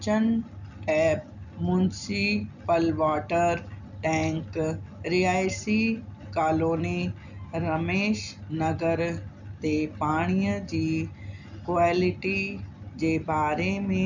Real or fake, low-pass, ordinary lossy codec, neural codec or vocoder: real; 7.2 kHz; none; none